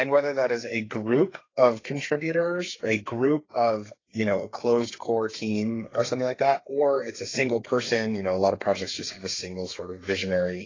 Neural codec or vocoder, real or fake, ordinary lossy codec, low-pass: codec, 32 kHz, 1.9 kbps, SNAC; fake; AAC, 32 kbps; 7.2 kHz